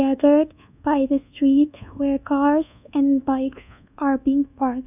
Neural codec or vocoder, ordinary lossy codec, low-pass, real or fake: codec, 24 kHz, 1.2 kbps, DualCodec; none; 3.6 kHz; fake